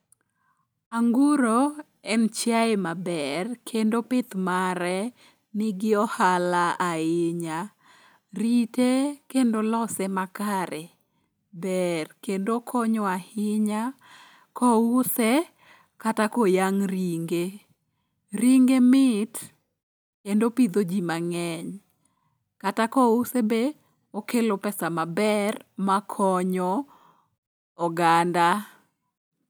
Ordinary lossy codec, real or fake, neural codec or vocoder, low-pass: none; real; none; none